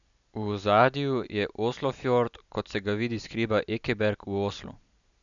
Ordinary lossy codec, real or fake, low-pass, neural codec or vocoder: none; real; 7.2 kHz; none